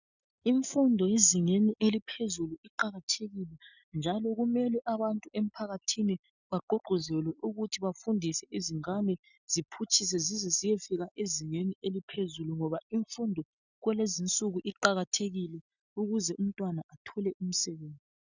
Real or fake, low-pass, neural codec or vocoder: real; 7.2 kHz; none